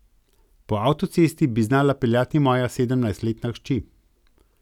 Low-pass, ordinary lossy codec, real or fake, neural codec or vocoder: 19.8 kHz; none; real; none